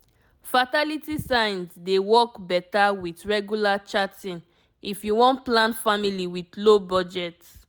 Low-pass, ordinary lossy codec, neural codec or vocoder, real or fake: none; none; none; real